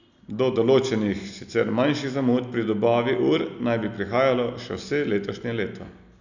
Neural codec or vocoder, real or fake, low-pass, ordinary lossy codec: none; real; 7.2 kHz; none